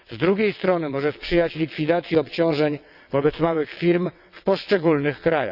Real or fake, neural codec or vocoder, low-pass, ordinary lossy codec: fake; vocoder, 22.05 kHz, 80 mel bands, WaveNeXt; 5.4 kHz; none